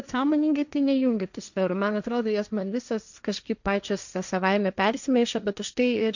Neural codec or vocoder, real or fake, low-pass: codec, 16 kHz, 1.1 kbps, Voila-Tokenizer; fake; 7.2 kHz